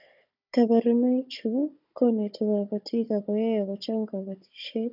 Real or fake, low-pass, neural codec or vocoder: fake; 5.4 kHz; codec, 16 kHz, 16 kbps, FunCodec, trained on Chinese and English, 50 frames a second